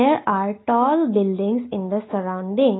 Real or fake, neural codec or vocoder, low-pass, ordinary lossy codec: real; none; 7.2 kHz; AAC, 16 kbps